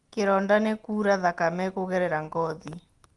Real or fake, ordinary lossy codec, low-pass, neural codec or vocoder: real; Opus, 24 kbps; 10.8 kHz; none